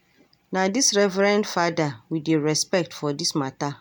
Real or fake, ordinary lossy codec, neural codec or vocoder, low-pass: real; none; none; none